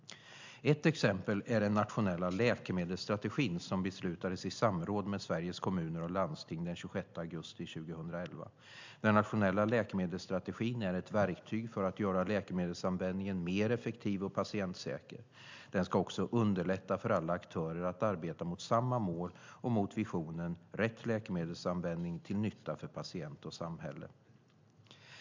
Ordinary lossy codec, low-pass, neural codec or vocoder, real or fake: MP3, 64 kbps; 7.2 kHz; none; real